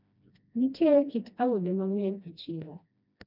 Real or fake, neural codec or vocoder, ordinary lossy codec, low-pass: fake; codec, 16 kHz, 1 kbps, FreqCodec, smaller model; none; 5.4 kHz